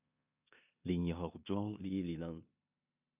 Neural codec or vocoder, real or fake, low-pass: codec, 16 kHz in and 24 kHz out, 0.9 kbps, LongCat-Audio-Codec, four codebook decoder; fake; 3.6 kHz